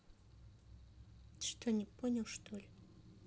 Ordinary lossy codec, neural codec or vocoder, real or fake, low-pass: none; none; real; none